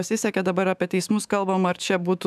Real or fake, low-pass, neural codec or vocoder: fake; 14.4 kHz; autoencoder, 48 kHz, 128 numbers a frame, DAC-VAE, trained on Japanese speech